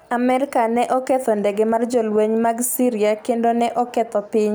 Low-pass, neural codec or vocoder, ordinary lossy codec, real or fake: none; none; none; real